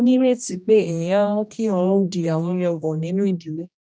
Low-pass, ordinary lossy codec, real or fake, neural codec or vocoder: none; none; fake; codec, 16 kHz, 1 kbps, X-Codec, HuBERT features, trained on general audio